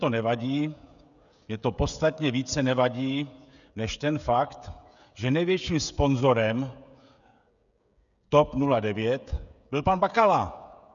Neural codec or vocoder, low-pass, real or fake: codec, 16 kHz, 16 kbps, FreqCodec, smaller model; 7.2 kHz; fake